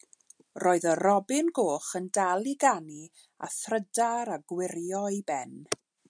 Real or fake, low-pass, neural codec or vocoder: real; 9.9 kHz; none